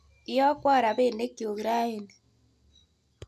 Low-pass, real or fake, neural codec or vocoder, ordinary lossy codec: 14.4 kHz; real; none; none